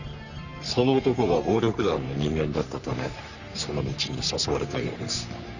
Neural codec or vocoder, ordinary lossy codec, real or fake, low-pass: codec, 44.1 kHz, 3.4 kbps, Pupu-Codec; none; fake; 7.2 kHz